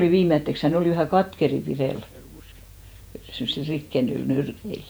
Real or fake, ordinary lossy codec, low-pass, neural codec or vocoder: fake; none; none; vocoder, 48 kHz, 128 mel bands, Vocos